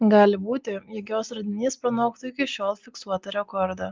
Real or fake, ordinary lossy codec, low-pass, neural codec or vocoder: real; Opus, 32 kbps; 7.2 kHz; none